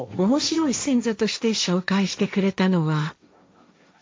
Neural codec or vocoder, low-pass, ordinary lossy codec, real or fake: codec, 16 kHz, 1.1 kbps, Voila-Tokenizer; none; none; fake